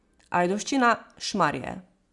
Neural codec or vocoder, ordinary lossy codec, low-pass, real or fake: none; Opus, 64 kbps; 10.8 kHz; real